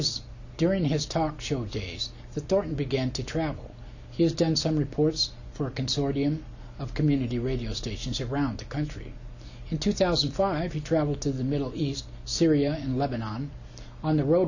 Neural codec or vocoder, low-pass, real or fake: none; 7.2 kHz; real